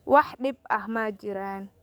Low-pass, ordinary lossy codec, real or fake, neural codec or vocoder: none; none; fake; codec, 44.1 kHz, 7.8 kbps, DAC